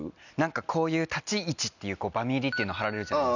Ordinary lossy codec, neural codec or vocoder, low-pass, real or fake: none; none; 7.2 kHz; real